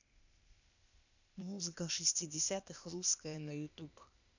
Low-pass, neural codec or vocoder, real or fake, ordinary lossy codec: 7.2 kHz; codec, 16 kHz, 0.8 kbps, ZipCodec; fake; none